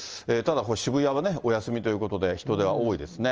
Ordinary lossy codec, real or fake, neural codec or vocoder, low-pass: Opus, 24 kbps; real; none; 7.2 kHz